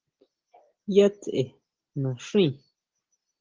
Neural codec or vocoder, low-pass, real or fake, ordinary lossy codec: none; 7.2 kHz; real; Opus, 16 kbps